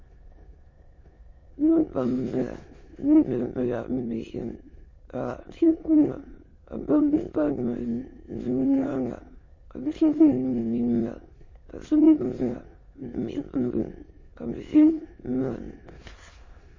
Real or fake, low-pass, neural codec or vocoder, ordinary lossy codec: fake; 7.2 kHz; autoencoder, 22.05 kHz, a latent of 192 numbers a frame, VITS, trained on many speakers; MP3, 32 kbps